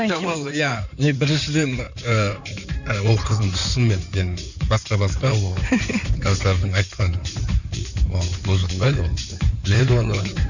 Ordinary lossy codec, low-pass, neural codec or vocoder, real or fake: none; 7.2 kHz; codec, 16 kHz in and 24 kHz out, 2.2 kbps, FireRedTTS-2 codec; fake